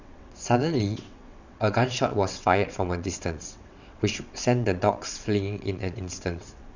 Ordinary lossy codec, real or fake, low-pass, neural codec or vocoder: none; fake; 7.2 kHz; vocoder, 22.05 kHz, 80 mel bands, WaveNeXt